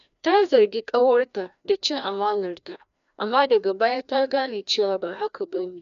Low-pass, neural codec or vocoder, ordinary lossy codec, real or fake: 7.2 kHz; codec, 16 kHz, 1 kbps, FreqCodec, larger model; none; fake